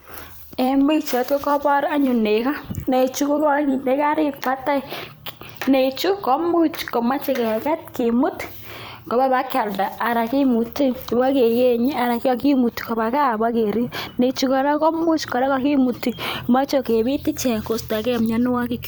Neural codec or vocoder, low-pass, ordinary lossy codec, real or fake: vocoder, 44.1 kHz, 128 mel bands every 256 samples, BigVGAN v2; none; none; fake